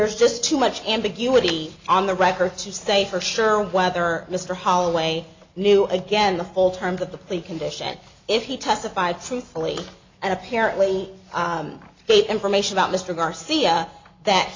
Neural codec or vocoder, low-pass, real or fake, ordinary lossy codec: none; 7.2 kHz; real; MP3, 64 kbps